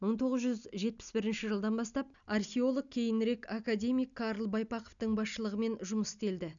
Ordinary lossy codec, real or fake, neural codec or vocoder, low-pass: none; real; none; 7.2 kHz